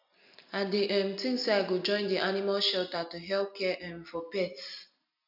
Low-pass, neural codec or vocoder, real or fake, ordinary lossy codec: 5.4 kHz; none; real; none